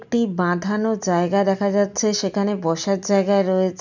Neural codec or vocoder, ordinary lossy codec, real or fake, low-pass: none; none; real; 7.2 kHz